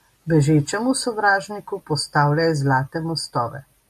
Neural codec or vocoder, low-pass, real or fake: none; 14.4 kHz; real